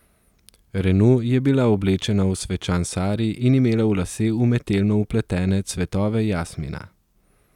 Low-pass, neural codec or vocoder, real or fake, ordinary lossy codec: 19.8 kHz; none; real; none